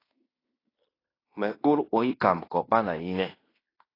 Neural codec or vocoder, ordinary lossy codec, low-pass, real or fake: codec, 16 kHz in and 24 kHz out, 0.9 kbps, LongCat-Audio-Codec, fine tuned four codebook decoder; AAC, 24 kbps; 5.4 kHz; fake